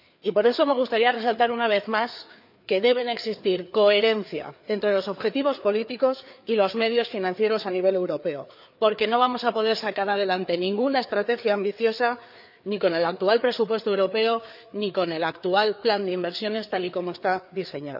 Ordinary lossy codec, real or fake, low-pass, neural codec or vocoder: none; fake; 5.4 kHz; codec, 16 kHz, 4 kbps, FreqCodec, larger model